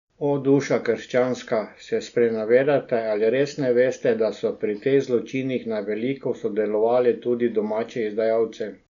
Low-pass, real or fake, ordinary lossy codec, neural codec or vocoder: 7.2 kHz; real; none; none